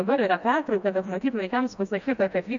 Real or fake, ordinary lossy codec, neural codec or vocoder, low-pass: fake; AAC, 64 kbps; codec, 16 kHz, 1 kbps, FreqCodec, smaller model; 7.2 kHz